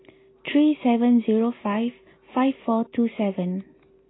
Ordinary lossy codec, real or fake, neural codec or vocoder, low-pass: AAC, 16 kbps; real; none; 7.2 kHz